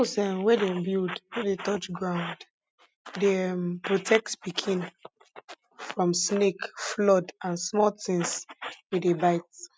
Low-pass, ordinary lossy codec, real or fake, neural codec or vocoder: none; none; real; none